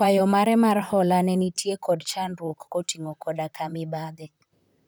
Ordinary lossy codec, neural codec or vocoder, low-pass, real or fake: none; vocoder, 44.1 kHz, 128 mel bands, Pupu-Vocoder; none; fake